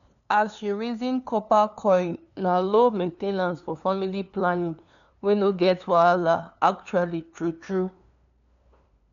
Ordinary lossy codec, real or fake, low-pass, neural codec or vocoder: none; fake; 7.2 kHz; codec, 16 kHz, 2 kbps, FunCodec, trained on LibriTTS, 25 frames a second